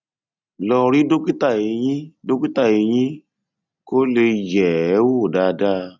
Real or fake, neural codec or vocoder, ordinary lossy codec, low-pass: real; none; none; 7.2 kHz